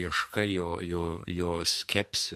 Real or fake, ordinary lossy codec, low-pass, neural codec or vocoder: fake; MP3, 64 kbps; 14.4 kHz; codec, 44.1 kHz, 2.6 kbps, SNAC